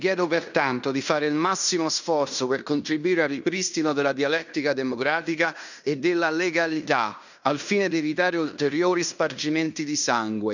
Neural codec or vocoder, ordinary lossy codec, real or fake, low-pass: codec, 16 kHz in and 24 kHz out, 0.9 kbps, LongCat-Audio-Codec, fine tuned four codebook decoder; none; fake; 7.2 kHz